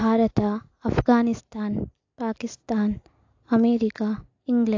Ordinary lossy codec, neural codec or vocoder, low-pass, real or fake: AAC, 48 kbps; none; 7.2 kHz; real